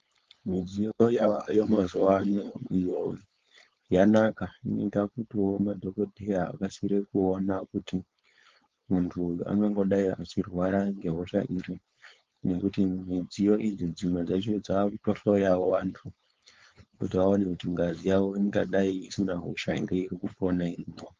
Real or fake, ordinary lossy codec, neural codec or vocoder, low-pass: fake; Opus, 32 kbps; codec, 16 kHz, 4.8 kbps, FACodec; 7.2 kHz